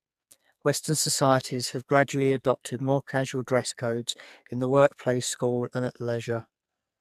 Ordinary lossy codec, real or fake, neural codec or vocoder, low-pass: AAC, 96 kbps; fake; codec, 44.1 kHz, 2.6 kbps, SNAC; 14.4 kHz